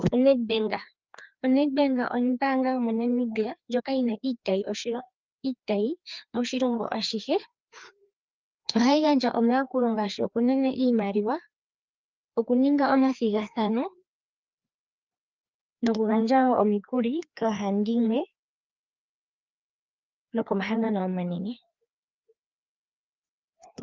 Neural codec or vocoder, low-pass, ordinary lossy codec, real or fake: codec, 16 kHz, 2 kbps, FreqCodec, larger model; 7.2 kHz; Opus, 24 kbps; fake